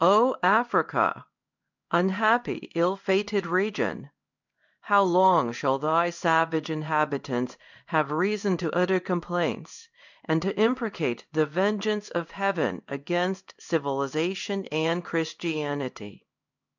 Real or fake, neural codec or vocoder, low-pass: fake; codec, 16 kHz in and 24 kHz out, 1 kbps, XY-Tokenizer; 7.2 kHz